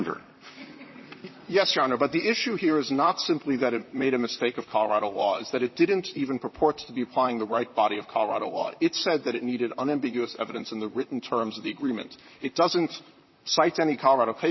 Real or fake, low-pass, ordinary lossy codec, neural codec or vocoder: fake; 7.2 kHz; MP3, 24 kbps; vocoder, 44.1 kHz, 128 mel bands, Pupu-Vocoder